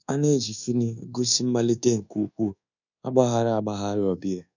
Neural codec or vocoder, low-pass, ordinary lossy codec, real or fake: codec, 24 kHz, 1.2 kbps, DualCodec; 7.2 kHz; none; fake